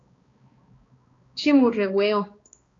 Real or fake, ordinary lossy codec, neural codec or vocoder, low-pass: fake; AAC, 32 kbps; codec, 16 kHz, 2 kbps, X-Codec, HuBERT features, trained on balanced general audio; 7.2 kHz